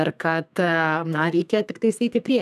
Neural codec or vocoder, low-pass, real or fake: codec, 32 kHz, 1.9 kbps, SNAC; 14.4 kHz; fake